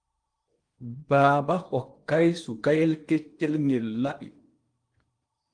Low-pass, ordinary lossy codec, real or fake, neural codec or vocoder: 9.9 kHz; Opus, 32 kbps; fake; codec, 16 kHz in and 24 kHz out, 0.8 kbps, FocalCodec, streaming, 65536 codes